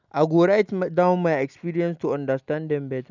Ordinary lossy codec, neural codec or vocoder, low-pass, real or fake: none; none; 7.2 kHz; real